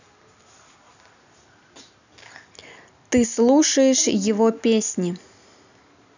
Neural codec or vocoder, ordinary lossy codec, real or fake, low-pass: none; none; real; 7.2 kHz